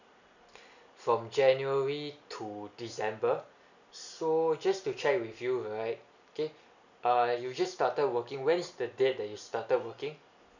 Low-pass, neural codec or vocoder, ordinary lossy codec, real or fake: 7.2 kHz; none; none; real